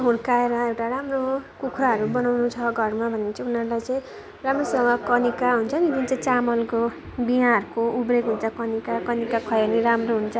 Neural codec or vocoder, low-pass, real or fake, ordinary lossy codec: none; none; real; none